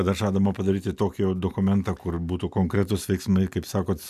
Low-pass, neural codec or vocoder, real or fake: 14.4 kHz; none; real